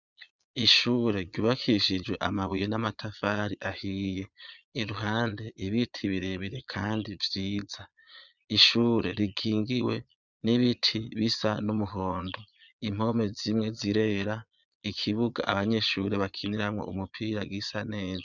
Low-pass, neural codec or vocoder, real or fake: 7.2 kHz; vocoder, 22.05 kHz, 80 mel bands, Vocos; fake